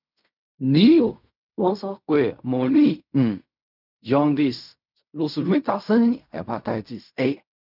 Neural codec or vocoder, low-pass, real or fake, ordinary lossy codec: codec, 16 kHz in and 24 kHz out, 0.4 kbps, LongCat-Audio-Codec, fine tuned four codebook decoder; 5.4 kHz; fake; none